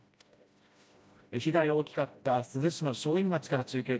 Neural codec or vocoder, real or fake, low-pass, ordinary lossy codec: codec, 16 kHz, 1 kbps, FreqCodec, smaller model; fake; none; none